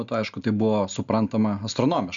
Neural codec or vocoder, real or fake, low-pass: none; real; 7.2 kHz